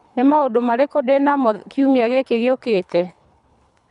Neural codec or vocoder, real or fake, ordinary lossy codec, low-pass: codec, 24 kHz, 3 kbps, HILCodec; fake; none; 10.8 kHz